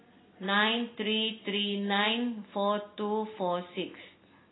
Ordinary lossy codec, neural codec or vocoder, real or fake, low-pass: AAC, 16 kbps; none; real; 7.2 kHz